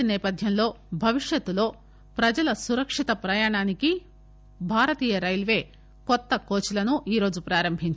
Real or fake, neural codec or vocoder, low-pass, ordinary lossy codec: real; none; none; none